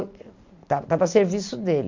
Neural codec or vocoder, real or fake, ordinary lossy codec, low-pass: none; real; MP3, 48 kbps; 7.2 kHz